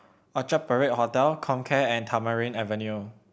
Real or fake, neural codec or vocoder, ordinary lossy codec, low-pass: real; none; none; none